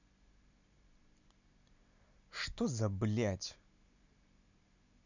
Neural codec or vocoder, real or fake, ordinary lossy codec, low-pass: none; real; none; 7.2 kHz